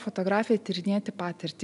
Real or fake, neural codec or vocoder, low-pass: real; none; 10.8 kHz